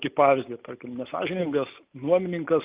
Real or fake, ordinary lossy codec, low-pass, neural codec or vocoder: real; Opus, 24 kbps; 3.6 kHz; none